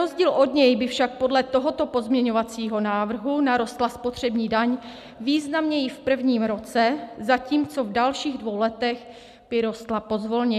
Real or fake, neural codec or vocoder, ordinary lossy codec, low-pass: real; none; MP3, 96 kbps; 14.4 kHz